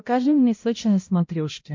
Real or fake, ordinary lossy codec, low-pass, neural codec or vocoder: fake; MP3, 64 kbps; 7.2 kHz; codec, 16 kHz, 0.5 kbps, X-Codec, HuBERT features, trained on balanced general audio